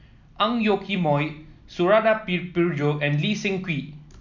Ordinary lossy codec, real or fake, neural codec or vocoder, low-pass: none; real; none; 7.2 kHz